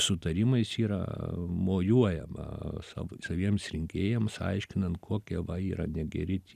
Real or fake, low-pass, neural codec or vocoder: real; 14.4 kHz; none